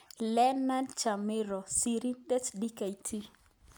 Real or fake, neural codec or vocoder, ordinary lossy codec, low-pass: real; none; none; none